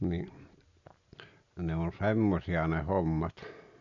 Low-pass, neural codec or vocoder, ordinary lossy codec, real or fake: 7.2 kHz; none; none; real